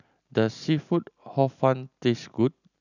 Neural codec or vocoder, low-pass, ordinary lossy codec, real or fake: none; 7.2 kHz; none; real